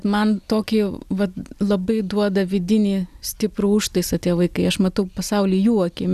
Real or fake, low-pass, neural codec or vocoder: real; 14.4 kHz; none